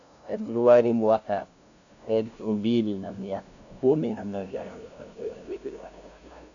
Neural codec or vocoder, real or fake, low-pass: codec, 16 kHz, 0.5 kbps, FunCodec, trained on LibriTTS, 25 frames a second; fake; 7.2 kHz